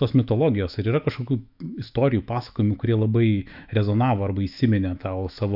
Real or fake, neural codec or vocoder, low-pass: fake; autoencoder, 48 kHz, 128 numbers a frame, DAC-VAE, trained on Japanese speech; 5.4 kHz